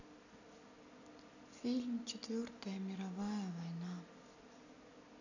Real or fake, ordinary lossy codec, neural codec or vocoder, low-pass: real; none; none; 7.2 kHz